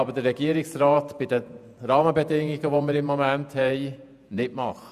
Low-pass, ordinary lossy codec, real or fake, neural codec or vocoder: 14.4 kHz; none; fake; vocoder, 48 kHz, 128 mel bands, Vocos